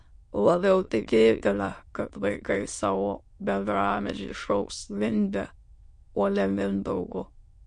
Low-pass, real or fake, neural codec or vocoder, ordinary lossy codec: 9.9 kHz; fake; autoencoder, 22.05 kHz, a latent of 192 numbers a frame, VITS, trained on many speakers; MP3, 48 kbps